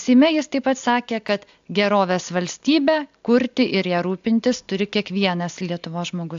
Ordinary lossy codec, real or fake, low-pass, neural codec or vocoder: AAC, 64 kbps; real; 7.2 kHz; none